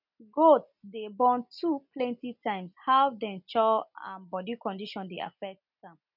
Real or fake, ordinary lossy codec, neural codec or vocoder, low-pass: real; none; none; 5.4 kHz